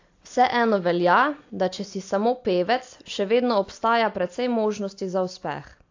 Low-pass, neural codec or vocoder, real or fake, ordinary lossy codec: 7.2 kHz; none; real; AAC, 48 kbps